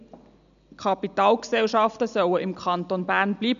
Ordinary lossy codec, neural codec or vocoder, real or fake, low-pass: none; none; real; 7.2 kHz